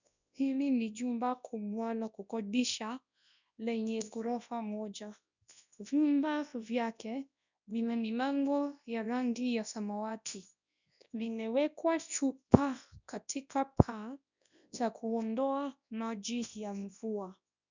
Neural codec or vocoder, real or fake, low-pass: codec, 24 kHz, 0.9 kbps, WavTokenizer, large speech release; fake; 7.2 kHz